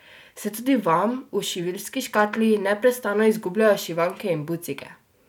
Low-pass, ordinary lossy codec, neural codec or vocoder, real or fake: none; none; none; real